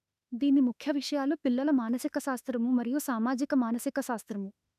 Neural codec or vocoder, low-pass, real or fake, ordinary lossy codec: autoencoder, 48 kHz, 32 numbers a frame, DAC-VAE, trained on Japanese speech; 14.4 kHz; fake; none